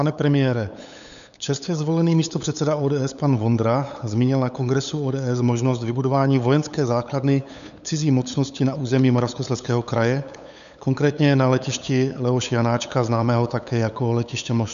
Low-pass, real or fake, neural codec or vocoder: 7.2 kHz; fake; codec, 16 kHz, 8 kbps, FunCodec, trained on LibriTTS, 25 frames a second